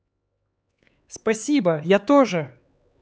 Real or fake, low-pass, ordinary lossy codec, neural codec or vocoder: fake; none; none; codec, 16 kHz, 4 kbps, X-Codec, HuBERT features, trained on LibriSpeech